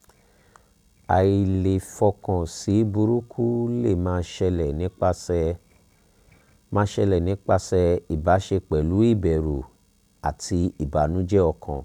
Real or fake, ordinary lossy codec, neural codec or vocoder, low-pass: real; none; none; 19.8 kHz